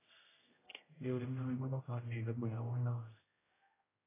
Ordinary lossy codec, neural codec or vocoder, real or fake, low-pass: AAC, 16 kbps; codec, 16 kHz, 0.5 kbps, X-Codec, HuBERT features, trained on general audio; fake; 3.6 kHz